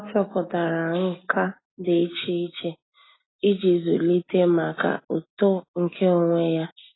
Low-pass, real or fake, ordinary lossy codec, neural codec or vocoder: 7.2 kHz; real; AAC, 16 kbps; none